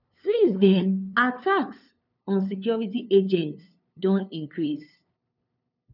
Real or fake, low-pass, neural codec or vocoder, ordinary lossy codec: fake; 5.4 kHz; codec, 16 kHz, 2 kbps, FunCodec, trained on LibriTTS, 25 frames a second; none